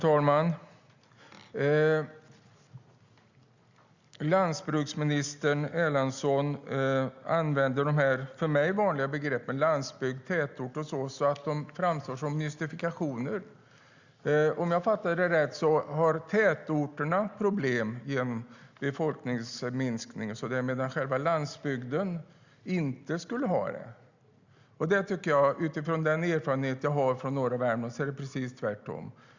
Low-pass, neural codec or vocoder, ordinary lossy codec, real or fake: 7.2 kHz; none; Opus, 64 kbps; real